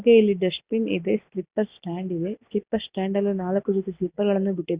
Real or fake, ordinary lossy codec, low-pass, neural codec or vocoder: real; Opus, 64 kbps; 3.6 kHz; none